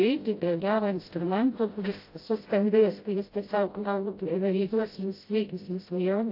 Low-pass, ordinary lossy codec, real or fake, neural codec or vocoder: 5.4 kHz; AAC, 32 kbps; fake; codec, 16 kHz, 0.5 kbps, FreqCodec, smaller model